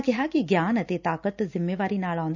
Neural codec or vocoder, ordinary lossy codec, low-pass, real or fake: none; none; 7.2 kHz; real